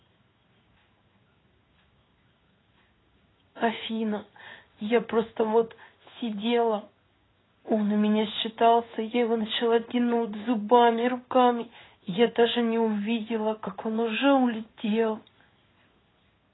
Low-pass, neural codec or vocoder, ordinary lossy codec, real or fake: 7.2 kHz; codec, 16 kHz in and 24 kHz out, 1 kbps, XY-Tokenizer; AAC, 16 kbps; fake